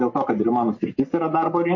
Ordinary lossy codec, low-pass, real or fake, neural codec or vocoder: MP3, 32 kbps; 7.2 kHz; real; none